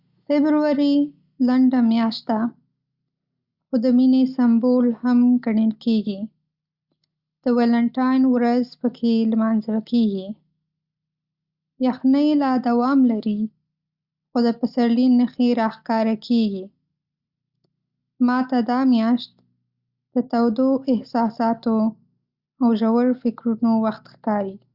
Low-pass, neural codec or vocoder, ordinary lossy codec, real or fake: 5.4 kHz; none; Opus, 64 kbps; real